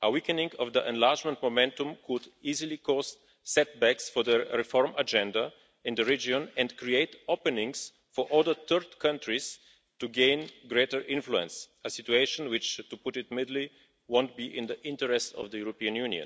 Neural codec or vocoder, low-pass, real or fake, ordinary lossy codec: none; none; real; none